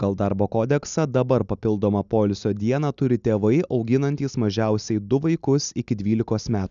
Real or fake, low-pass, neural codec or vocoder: real; 7.2 kHz; none